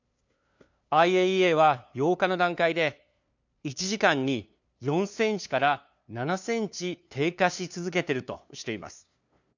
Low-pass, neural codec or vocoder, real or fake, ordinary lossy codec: 7.2 kHz; codec, 16 kHz, 2 kbps, FunCodec, trained on LibriTTS, 25 frames a second; fake; none